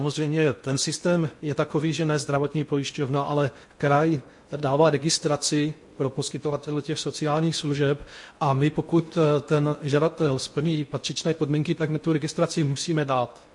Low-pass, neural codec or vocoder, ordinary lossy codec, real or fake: 10.8 kHz; codec, 16 kHz in and 24 kHz out, 0.6 kbps, FocalCodec, streaming, 2048 codes; MP3, 48 kbps; fake